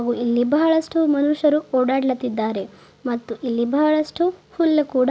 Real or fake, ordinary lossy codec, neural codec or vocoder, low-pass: real; none; none; none